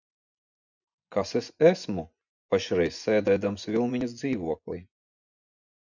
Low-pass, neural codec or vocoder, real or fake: 7.2 kHz; vocoder, 24 kHz, 100 mel bands, Vocos; fake